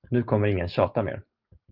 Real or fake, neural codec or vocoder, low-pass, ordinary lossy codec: real; none; 5.4 kHz; Opus, 24 kbps